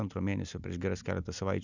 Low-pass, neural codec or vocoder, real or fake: 7.2 kHz; none; real